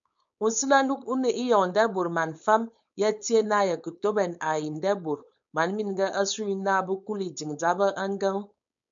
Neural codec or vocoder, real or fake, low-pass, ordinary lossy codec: codec, 16 kHz, 4.8 kbps, FACodec; fake; 7.2 kHz; MP3, 96 kbps